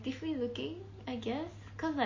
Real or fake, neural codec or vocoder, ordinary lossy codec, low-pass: real; none; MP3, 32 kbps; 7.2 kHz